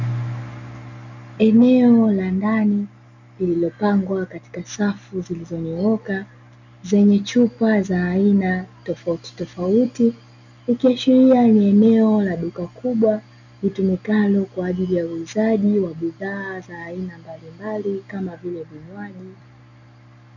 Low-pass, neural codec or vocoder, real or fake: 7.2 kHz; none; real